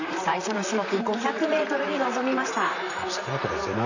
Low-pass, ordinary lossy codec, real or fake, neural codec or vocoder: 7.2 kHz; none; fake; vocoder, 44.1 kHz, 128 mel bands, Pupu-Vocoder